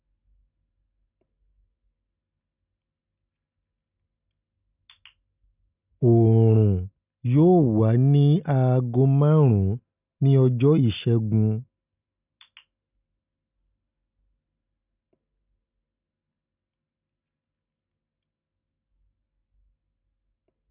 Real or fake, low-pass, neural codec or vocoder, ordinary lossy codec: real; 3.6 kHz; none; none